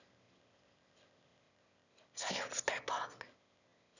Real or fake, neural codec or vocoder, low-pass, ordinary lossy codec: fake; autoencoder, 22.05 kHz, a latent of 192 numbers a frame, VITS, trained on one speaker; 7.2 kHz; none